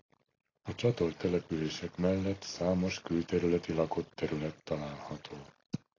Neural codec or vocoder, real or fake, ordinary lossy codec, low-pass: none; real; AAC, 32 kbps; 7.2 kHz